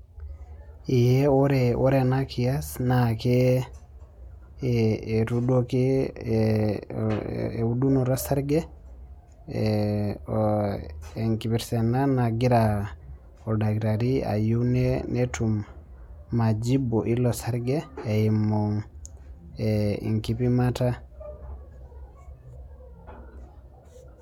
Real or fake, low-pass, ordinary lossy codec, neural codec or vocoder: real; 19.8 kHz; MP3, 96 kbps; none